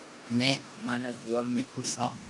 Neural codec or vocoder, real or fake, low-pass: codec, 16 kHz in and 24 kHz out, 0.9 kbps, LongCat-Audio-Codec, four codebook decoder; fake; 10.8 kHz